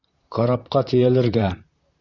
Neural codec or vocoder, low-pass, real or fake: vocoder, 44.1 kHz, 128 mel bands, Pupu-Vocoder; 7.2 kHz; fake